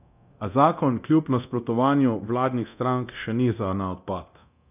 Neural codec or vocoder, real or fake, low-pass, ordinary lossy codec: codec, 24 kHz, 0.9 kbps, DualCodec; fake; 3.6 kHz; none